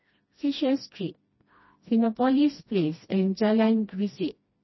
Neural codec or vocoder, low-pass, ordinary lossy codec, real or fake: codec, 16 kHz, 1 kbps, FreqCodec, smaller model; 7.2 kHz; MP3, 24 kbps; fake